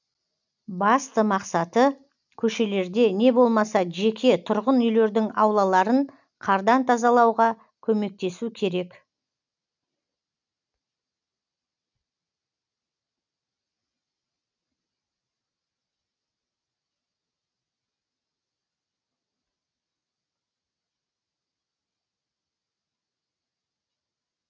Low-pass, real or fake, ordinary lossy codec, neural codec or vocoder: 7.2 kHz; real; none; none